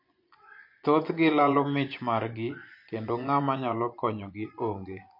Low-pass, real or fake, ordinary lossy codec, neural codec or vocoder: 5.4 kHz; fake; MP3, 32 kbps; vocoder, 24 kHz, 100 mel bands, Vocos